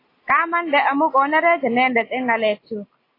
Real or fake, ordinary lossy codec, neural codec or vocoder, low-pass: real; AAC, 24 kbps; none; 5.4 kHz